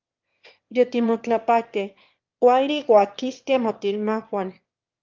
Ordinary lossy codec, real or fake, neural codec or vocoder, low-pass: Opus, 32 kbps; fake; autoencoder, 22.05 kHz, a latent of 192 numbers a frame, VITS, trained on one speaker; 7.2 kHz